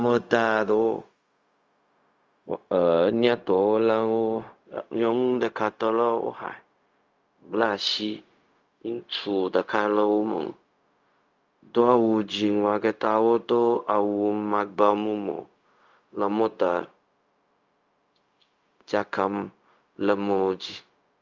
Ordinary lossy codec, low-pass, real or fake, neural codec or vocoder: Opus, 32 kbps; 7.2 kHz; fake; codec, 16 kHz, 0.4 kbps, LongCat-Audio-Codec